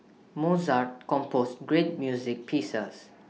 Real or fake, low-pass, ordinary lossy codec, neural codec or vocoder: real; none; none; none